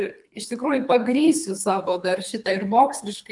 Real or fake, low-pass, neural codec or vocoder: fake; 10.8 kHz; codec, 24 kHz, 3 kbps, HILCodec